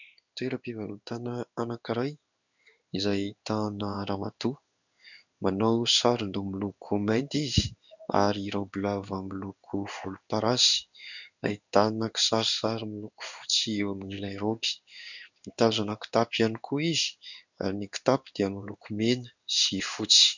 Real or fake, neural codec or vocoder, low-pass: fake; codec, 16 kHz in and 24 kHz out, 1 kbps, XY-Tokenizer; 7.2 kHz